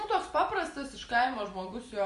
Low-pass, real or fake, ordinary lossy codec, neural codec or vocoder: 14.4 kHz; fake; MP3, 48 kbps; vocoder, 44.1 kHz, 128 mel bands every 256 samples, BigVGAN v2